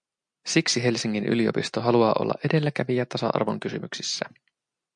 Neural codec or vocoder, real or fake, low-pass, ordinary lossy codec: none; real; 9.9 kHz; MP3, 64 kbps